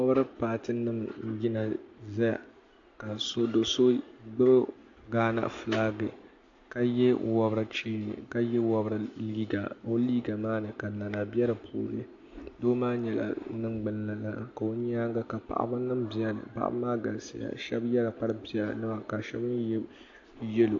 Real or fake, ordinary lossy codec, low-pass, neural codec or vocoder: real; AAC, 48 kbps; 7.2 kHz; none